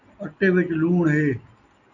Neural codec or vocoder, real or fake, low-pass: none; real; 7.2 kHz